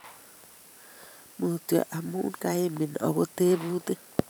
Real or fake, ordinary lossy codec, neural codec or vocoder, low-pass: fake; none; vocoder, 44.1 kHz, 128 mel bands every 512 samples, BigVGAN v2; none